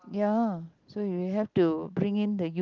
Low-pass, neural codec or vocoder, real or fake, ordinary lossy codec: 7.2 kHz; none; real; Opus, 32 kbps